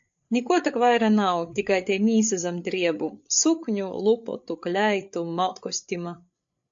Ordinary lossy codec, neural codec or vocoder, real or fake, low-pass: AAC, 64 kbps; codec, 16 kHz, 8 kbps, FreqCodec, larger model; fake; 7.2 kHz